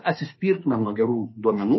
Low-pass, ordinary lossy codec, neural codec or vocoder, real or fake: 7.2 kHz; MP3, 24 kbps; autoencoder, 48 kHz, 32 numbers a frame, DAC-VAE, trained on Japanese speech; fake